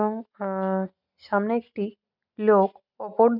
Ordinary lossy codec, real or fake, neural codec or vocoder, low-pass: none; real; none; 5.4 kHz